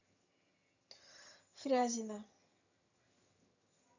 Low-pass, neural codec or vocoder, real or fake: 7.2 kHz; vocoder, 22.05 kHz, 80 mel bands, HiFi-GAN; fake